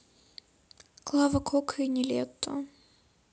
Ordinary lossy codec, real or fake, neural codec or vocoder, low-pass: none; real; none; none